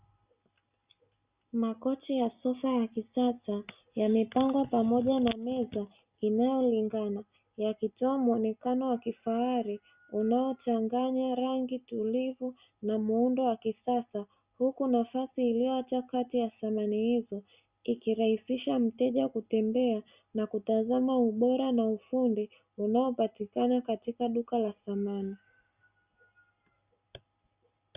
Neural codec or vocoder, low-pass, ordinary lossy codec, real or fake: none; 3.6 kHz; Opus, 64 kbps; real